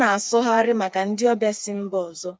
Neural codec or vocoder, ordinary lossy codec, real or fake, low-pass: codec, 16 kHz, 4 kbps, FreqCodec, smaller model; none; fake; none